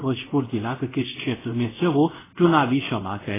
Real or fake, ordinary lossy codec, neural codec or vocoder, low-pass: fake; AAC, 16 kbps; codec, 24 kHz, 0.5 kbps, DualCodec; 3.6 kHz